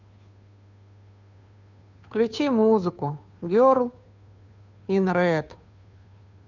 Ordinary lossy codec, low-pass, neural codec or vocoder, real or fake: none; 7.2 kHz; codec, 16 kHz, 2 kbps, FunCodec, trained on Chinese and English, 25 frames a second; fake